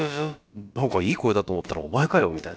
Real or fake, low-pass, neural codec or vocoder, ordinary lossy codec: fake; none; codec, 16 kHz, about 1 kbps, DyCAST, with the encoder's durations; none